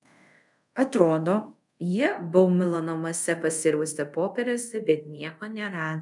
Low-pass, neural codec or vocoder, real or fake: 10.8 kHz; codec, 24 kHz, 0.5 kbps, DualCodec; fake